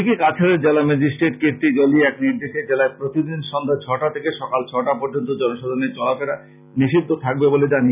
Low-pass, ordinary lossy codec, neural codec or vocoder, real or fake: 3.6 kHz; none; none; real